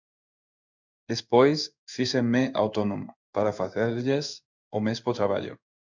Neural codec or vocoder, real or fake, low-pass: codec, 16 kHz in and 24 kHz out, 1 kbps, XY-Tokenizer; fake; 7.2 kHz